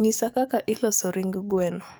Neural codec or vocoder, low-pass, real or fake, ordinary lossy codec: codec, 44.1 kHz, 7.8 kbps, DAC; none; fake; none